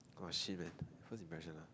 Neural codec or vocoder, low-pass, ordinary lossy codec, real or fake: none; none; none; real